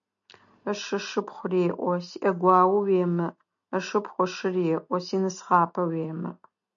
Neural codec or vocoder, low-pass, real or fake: none; 7.2 kHz; real